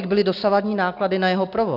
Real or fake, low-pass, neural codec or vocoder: real; 5.4 kHz; none